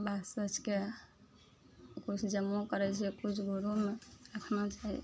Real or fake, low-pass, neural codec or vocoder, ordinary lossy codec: real; none; none; none